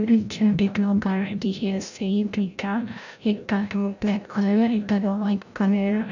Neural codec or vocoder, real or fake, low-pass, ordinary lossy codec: codec, 16 kHz, 0.5 kbps, FreqCodec, larger model; fake; 7.2 kHz; none